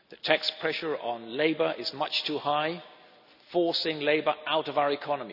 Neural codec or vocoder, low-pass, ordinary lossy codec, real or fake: none; 5.4 kHz; none; real